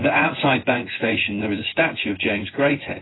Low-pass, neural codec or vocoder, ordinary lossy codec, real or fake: 7.2 kHz; vocoder, 24 kHz, 100 mel bands, Vocos; AAC, 16 kbps; fake